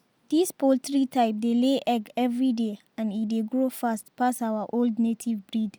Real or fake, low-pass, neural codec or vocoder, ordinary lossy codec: real; none; none; none